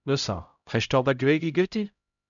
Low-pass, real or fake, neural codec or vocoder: 7.2 kHz; fake; codec, 16 kHz, 0.5 kbps, X-Codec, HuBERT features, trained on LibriSpeech